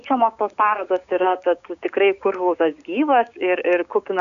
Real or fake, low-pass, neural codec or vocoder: fake; 7.2 kHz; codec, 16 kHz, 6 kbps, DAC